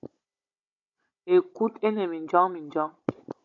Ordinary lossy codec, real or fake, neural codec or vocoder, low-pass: MP3, 48 kbps; fake; codec, 16 kHz, 16 kbps, FunCodec, trained on Chinese and English, 50 frames a second; 7.2 kHz